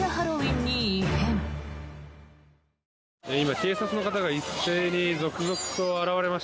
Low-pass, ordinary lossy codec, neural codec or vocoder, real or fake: none; none; none; real